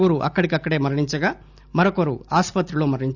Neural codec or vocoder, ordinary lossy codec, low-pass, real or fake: none; none; 7.2 kHz; real